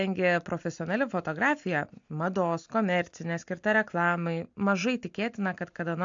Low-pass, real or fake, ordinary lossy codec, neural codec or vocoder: 7.2 kHz; real; AAC, 64 kbps; none